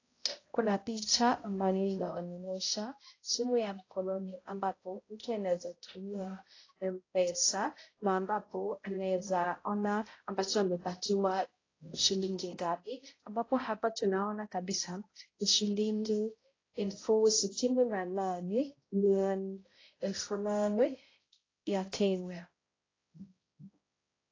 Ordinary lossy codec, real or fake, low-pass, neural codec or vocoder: AAC, 32 kbps; fake; 7.2 kHz; codec, 16 kHz, 0.5 kbps, X-Codec, HuBERT features, trained on balanced general audio